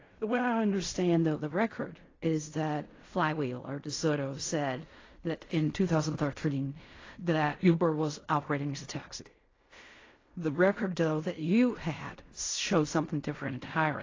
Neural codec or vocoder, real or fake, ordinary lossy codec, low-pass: codec, 16 kHz in and 24 kHz out, 0.4 kbps, LongCat-Audio-Codec, fine tuned four codebook decoder; fake; AAC, 32 kbps; 7.2 kHz